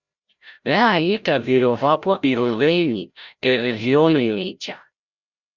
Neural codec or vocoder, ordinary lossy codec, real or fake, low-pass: codec, 16 kHz, 0.5 kbps, FreqCodec, larger model; Opus, 64 kbps; fake; 7.2 kHz